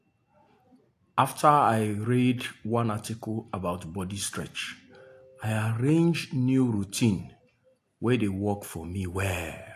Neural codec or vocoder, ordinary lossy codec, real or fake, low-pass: none; AAC, 64 kbps; real; 14.4 kHz